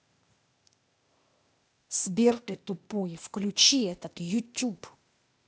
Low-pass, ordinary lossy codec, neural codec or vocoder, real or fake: none; none; codec, 16 kHz, 0.8 kbps, ZipCodec; fake